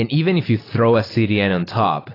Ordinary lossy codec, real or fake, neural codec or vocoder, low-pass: AAC, 24 kbps; real; none; 5.4 kHz